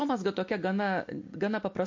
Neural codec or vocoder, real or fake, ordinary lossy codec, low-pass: none; real; MP3, 48 kbps; 7.2 kHz